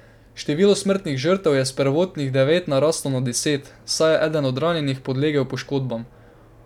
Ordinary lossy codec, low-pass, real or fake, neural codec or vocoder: none; 19.8 kHz; real; none